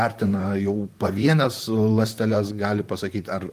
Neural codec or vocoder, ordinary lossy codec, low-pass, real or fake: vocoder, 44.1 kHz, 128 mel bands, Pupu-Vocoder; Opus, 32 kbps; 14.4 kHz; fake